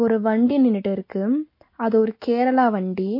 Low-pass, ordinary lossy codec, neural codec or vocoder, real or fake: 5.4 kHz; MP3, 32 kbps; none; real